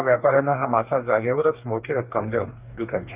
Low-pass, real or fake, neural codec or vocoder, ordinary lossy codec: 3.6 kHz; fake; codec, 44.1 kHz, 2.6 kbps, DAC; Opus, 24 kbps